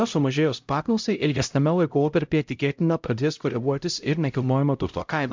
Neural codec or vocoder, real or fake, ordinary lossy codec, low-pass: codec, 16 kHz, 0.5 kbps, X-Codec, HuBERT features, trained on LibriSpeech; fake; MP3, 64 kbps; 7.2 kHz